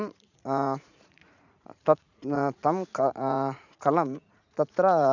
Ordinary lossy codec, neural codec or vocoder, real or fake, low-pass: none; vocoder, 44.1 kHz, 80 mel bands, Vocos; fake; 7.2 kHz